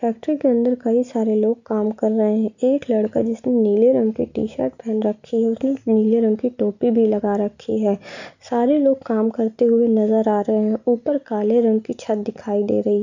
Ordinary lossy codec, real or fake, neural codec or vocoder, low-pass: none; real; none; 7.2 kHz